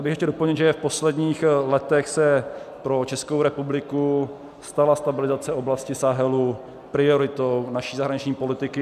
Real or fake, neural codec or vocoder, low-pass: real; none; 14.4 kHz